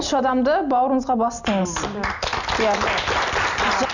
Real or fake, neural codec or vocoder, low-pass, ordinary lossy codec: real; none; 7.2 kHz; none